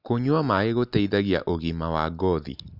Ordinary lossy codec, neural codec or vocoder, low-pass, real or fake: none; none; 5.4 kHz; real